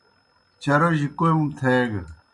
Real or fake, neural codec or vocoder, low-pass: real; none; 10.8 kHz